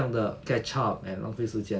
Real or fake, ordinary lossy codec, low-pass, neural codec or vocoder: real; none; none; none